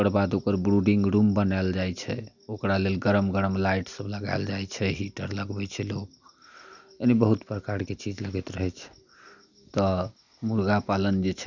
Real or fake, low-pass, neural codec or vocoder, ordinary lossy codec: real; 7.2 kHz; none; none